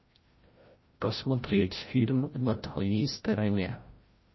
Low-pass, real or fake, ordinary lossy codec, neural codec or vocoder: 7.2 kHz; fake; MP3, 24 kbps; codec, 16 kHz, 0.5 kbps, FreqCodec, larger model